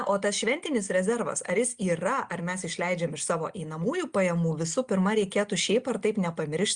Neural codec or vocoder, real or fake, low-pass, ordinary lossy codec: none; real; 9.9 kHz; Opus, 64 kbps